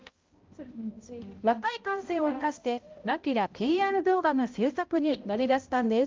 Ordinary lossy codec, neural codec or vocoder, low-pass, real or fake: Opus, 32 kbps; codec, 16 kHz, 0.5 kbps, X-Codec, HuBERT features, trained on balanced general audio; 7.2 kHz; fake